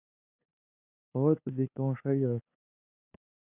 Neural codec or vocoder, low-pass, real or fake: codec, 16 kHz, 2 kbps, FunCodec, trained on LibriTTS, 25 frames a second; 3.6 kHz; fake